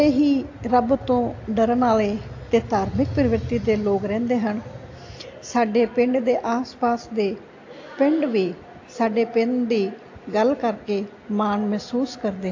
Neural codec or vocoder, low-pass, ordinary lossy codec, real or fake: none; 7.2 kHz; AAC, 48 kbps; real